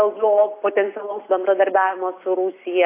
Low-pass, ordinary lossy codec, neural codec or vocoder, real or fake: 3.6 kHz; AAC, 24 kbps; none; real